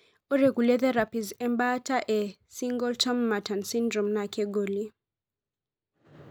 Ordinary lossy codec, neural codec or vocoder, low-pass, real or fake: none; none; none; real